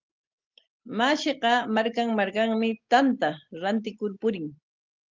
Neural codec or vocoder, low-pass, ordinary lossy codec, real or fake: none; 7.2 kHz; Opus, 32 kbps; real